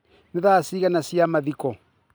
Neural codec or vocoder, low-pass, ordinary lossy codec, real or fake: none; none; none; real